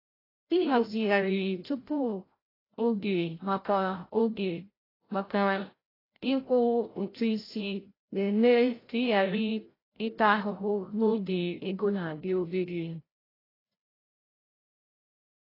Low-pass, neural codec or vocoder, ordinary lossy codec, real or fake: 5.4 kHz; codec, 16 kHz, 0.5 kbps, FreqCodec, larger model; AAC, 24 kbps; fake